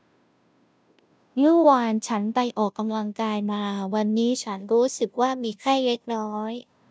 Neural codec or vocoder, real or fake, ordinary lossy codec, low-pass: codec, 16 kHz, 0.5 kbps, FunCodec, trained on Chinese and English, 25 frames a second; fake; none; none